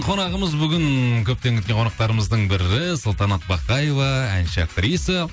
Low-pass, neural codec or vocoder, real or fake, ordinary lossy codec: none; none; real; none